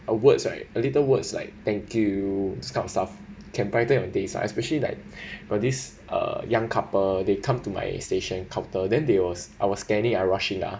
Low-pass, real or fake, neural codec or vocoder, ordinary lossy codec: none; real; none; none